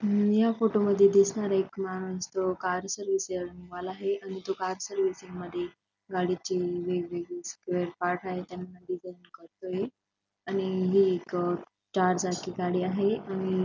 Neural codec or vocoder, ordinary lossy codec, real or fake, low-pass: none; none; real; 7.2 kHz